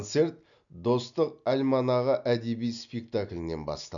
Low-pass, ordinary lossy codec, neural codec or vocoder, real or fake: 7.2 kHz; none; none; real